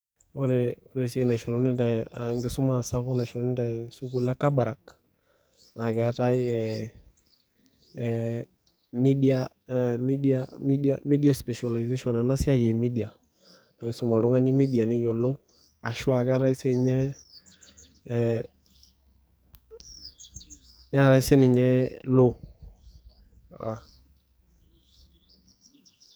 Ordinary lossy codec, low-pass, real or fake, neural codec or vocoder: none; none; fake; codec, 44.1 kHz, 2.6 kbps, SNAC